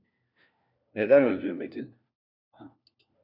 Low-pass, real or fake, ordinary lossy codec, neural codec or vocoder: 5.4 kHz; fake; MP3, 48 kbps; codec, 16 kHz, 1 kbps, FunCodec, trained on LibriTTS, 50 frames a second